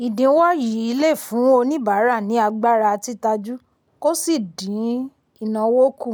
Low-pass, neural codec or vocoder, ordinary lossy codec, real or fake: none; none; none; real